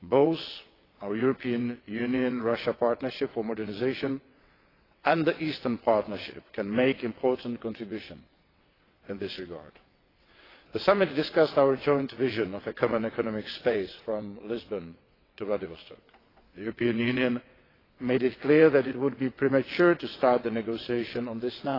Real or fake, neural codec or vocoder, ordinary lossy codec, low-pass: fake; vocoder, 22.05 kHz, 80 mel bands, WaveNeXt; AAC, 24 kbps; 5.4 kHz